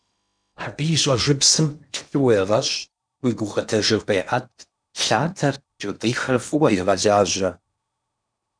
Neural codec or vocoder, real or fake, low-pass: codec, 16 kHz in and 24 kHz out, 0.8 kbps, FocalCodec, streaming, 65536 codes; fake; 9.9 kHz